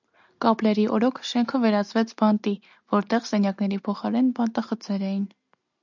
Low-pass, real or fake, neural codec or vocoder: 7.2 kHz; real; none